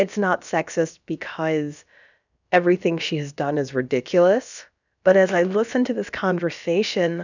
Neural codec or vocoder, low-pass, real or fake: codec, 16 kHz, about 1 kbps, DyCAST, with the encoder's durations; 7.2 kHz; fake